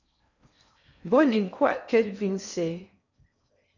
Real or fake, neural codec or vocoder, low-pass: fake; codec, 16 kHz in and 24 kHz out, 0.6 kbps, FocalCodec, streaming, 4096 codes; 7.2 kHz